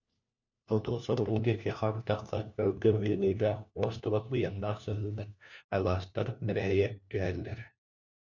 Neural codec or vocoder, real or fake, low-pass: codec, 16 kHz, 1 kbps, FunCodec, trained on LibriTTS, 50 frames a second; fake; 7.2 kHz